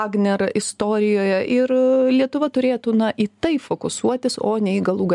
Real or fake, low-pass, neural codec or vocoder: real; 10.8 kHz; none